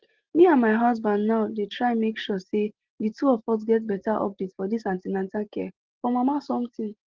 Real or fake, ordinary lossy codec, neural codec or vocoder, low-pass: real; Opus, 16 kbps; none; 7.2 kHz